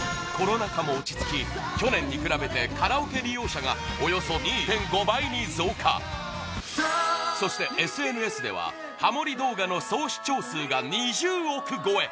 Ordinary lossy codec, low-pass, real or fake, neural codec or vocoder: none; none; real; none